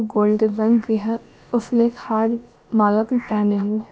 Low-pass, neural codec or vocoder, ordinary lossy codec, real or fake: none; codec, 16 kHz, about 1 kbps, DyCAST, with the encoder's durations; none; fake